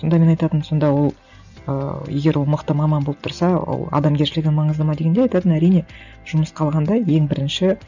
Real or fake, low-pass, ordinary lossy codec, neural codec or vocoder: real; 7.2 kHz; none; none